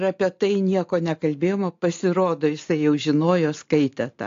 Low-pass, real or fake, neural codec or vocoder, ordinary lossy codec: 7.2 kHz; real; none; AAC, 48 kbps